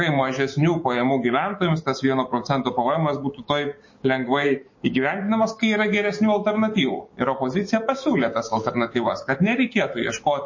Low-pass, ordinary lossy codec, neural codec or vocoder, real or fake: 7.2 kHz; MP3, 32 kbps; codec, 16 kHz, 6 kbps, DAC; fake